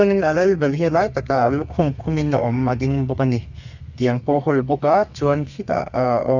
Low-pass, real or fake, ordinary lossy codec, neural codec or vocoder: 7.2 kHz; fake; none; codec, 32 kHz, 1.9 kbps, SNAC